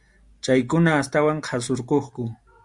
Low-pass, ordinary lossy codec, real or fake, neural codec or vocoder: 10.8 kHz; Opus, 64 kbps; real; none